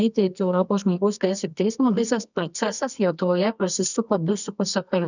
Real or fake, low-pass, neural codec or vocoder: fake; 7.2 kHz; codec, 24 kHz, 0.9 kbps, WavTokenizer, medium music audio release